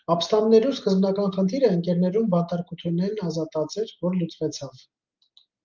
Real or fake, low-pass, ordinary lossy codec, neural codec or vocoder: real; 7.2 kHz; Opus, 24 kbps; none